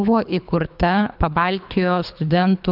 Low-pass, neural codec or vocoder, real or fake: 5.4 kHz; codec, 24 kHz, 3 kbps, HILCodec; fake